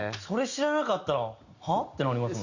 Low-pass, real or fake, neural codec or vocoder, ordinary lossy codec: 7.2 kHz; real; none; Opus, 64 kbps